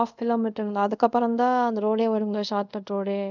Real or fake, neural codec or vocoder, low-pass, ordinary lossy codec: fake; codec, 24 kHz, 0.5 kbps, DualCodec; 7.2 kHz; none